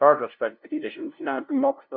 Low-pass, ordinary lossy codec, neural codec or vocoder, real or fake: 5.4 kHz; MP3, 48 kbps; codec, 16 kHz, 0.5 kbps, FunCodec, trained on LibriTTS, 25 frames a second; fake